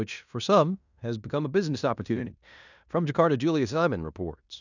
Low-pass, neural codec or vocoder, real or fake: 7.2 kHz; codec, 16 kHz in and 24 kHz out, 0.9 kbps, LongCat-Audio-Codec, fine tuned four codebook decoder; fake